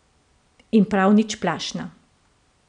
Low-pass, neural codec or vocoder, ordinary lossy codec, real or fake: 9.9 kHz; none; none; real